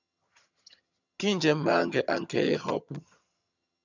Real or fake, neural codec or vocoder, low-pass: fake; vocoder, 22.05 kHz, 80 mel bands, HiFi-GAN; 7.2 kHz